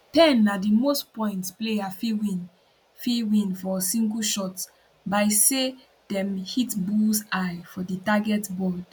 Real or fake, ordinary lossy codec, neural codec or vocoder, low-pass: real; none; none; none